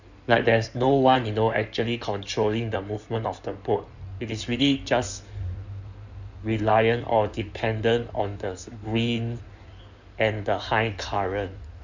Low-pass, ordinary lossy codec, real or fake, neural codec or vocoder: 7.2 kHz; none; fake; codec, 16 kHz in and 24 kHz out, 2.2 kbps, FireRedTTS-2 codec